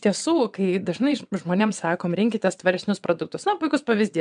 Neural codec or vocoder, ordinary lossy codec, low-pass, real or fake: vocoder, 22.05 kHz, 80 mel bands, WaveNeXt; MP3, 96 kbps; 9.9 kHz; fake